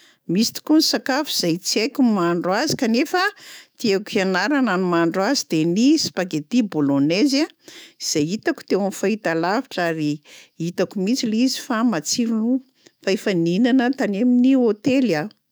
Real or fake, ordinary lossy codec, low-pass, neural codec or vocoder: fake; none; none; autoencoder, 48 kHz, 128 numbers a frame, DAC-VAE, trained on Japanese speech